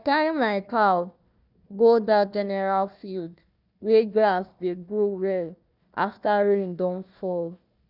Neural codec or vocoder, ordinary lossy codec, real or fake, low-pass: codec, 16 kHz, 1 kbps, FunCodec, trained on Chinese and English, 50 frames a second; none; fake; 5.4 kHz